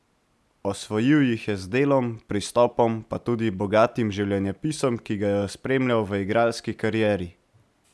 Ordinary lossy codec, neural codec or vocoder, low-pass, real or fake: none; none; none; real